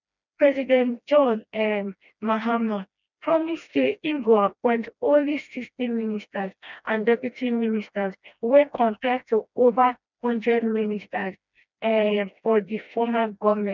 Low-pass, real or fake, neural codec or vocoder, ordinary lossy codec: 7.2 kHz; fake; codec, 16 kHz, 1 kbps, FreqCodec, smaller model; none